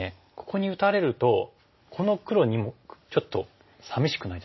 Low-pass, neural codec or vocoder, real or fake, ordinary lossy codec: 7.2 kHz; none; real; MP3, 24 kbps